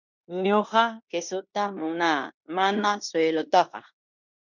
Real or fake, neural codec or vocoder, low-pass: fake; codec, 16 kHz in and 24 kHz out, 0.9 kbps, LongCat-Audio-Codec, fine tuned four codebook decoder; 7.2 kHz